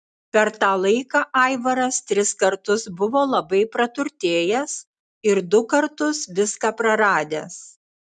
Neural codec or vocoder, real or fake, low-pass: none; real; 10.8 kHz